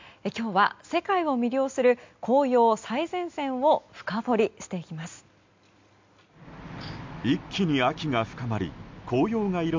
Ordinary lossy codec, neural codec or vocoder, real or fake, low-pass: MP3, 64 kbps; none; real; 7.2 kHz